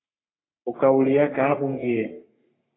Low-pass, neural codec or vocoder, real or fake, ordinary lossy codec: 7.2 kHz; codec, 44.1 kHz, 3.4 kbps, Pupu-Codec; fake; AAC, 16 kbps